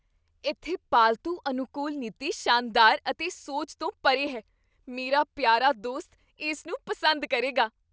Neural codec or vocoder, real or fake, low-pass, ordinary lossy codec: none; real; none; none